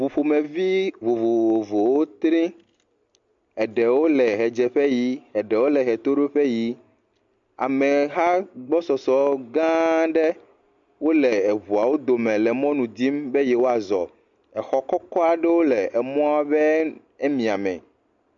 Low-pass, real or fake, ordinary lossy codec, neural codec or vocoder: 7.2 kHz; real; MP3, 48 kbps; none